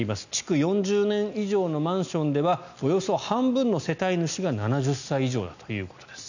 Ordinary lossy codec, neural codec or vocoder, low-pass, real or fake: none; none; 7.2 kHz; real